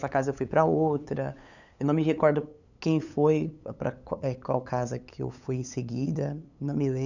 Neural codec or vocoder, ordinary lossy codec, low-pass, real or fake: codec, 16 kHz, 8 kbps, FunCodec, trained on LibriTTS, 25 frames a second; none; 7.2 kHz; fake